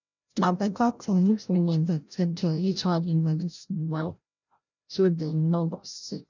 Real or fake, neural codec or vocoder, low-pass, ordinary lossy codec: fake; codec, 16 kHz, 0.5 kbps, FreqCodec, larger model; 7.2 kHz; none